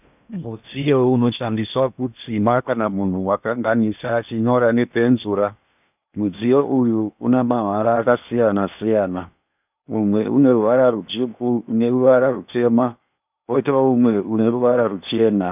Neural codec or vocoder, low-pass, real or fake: codec, 16 kHz in and 24 kHz out, 0.8 kbps, FocalCodec, streaming, 65536 codes; 3.6 kHz; fake